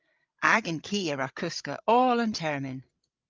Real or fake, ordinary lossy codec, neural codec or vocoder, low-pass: fake; Opus, 24 kbps; codec, 16 kHz, 16 kbps, FreqCodec, larger model; 7.2 kHz